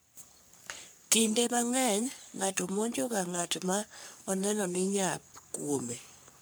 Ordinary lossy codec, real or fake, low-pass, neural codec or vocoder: none; fake; none; codec, 44.1 kHz, 3.4 kbps, Pupu-Codec